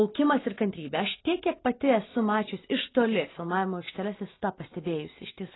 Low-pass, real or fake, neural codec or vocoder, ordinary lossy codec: 7.2 kHz; real; none; AAC, 16 kbps